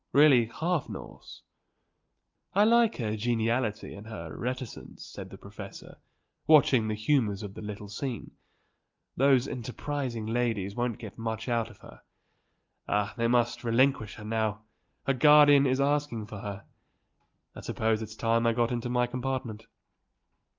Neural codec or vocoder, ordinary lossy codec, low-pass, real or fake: none; Opus, 32 kbps; 7.2 kHz; real